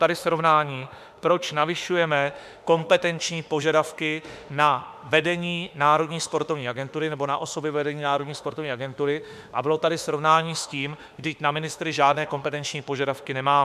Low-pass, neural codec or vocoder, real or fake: 14.4 kHz; autoencoder, 48 kHz, 32 numbers a frame, DAC-VAE, trained on Japanese speech; fake